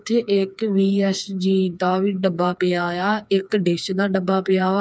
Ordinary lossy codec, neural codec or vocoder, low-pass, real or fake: none; codec, 16 kHz, 4 kbps, FreqCodec, smaller model; none; fake